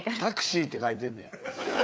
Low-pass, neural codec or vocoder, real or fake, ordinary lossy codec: none; codec, 16 kHz, 4 kbps, FreqCodec, larger model; fake; none